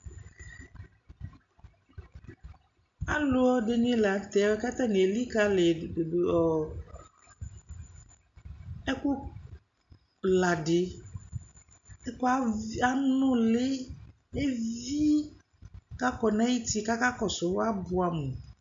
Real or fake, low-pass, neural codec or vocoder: real; 7.2 kHz; none